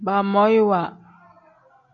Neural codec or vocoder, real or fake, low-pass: none; real; 7.2 kHz